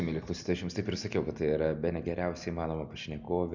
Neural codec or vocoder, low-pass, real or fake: none; 7.2 kHz; real